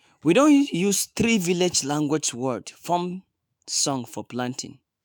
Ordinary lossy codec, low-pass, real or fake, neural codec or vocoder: none; none; fake; autoencoder, 48 kHz, 128 numbers a frame, DAC-VAE, trained on Japanese speech